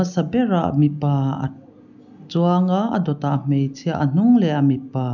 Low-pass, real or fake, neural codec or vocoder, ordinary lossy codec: 7.2 kHz; real; none; none